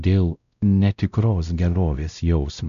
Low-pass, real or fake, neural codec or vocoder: 7.2 kHz; fake; codec, 16 kHz, 0.5 kbps, X-Codec, WavLM features, trained on Multilingual LibriSpeech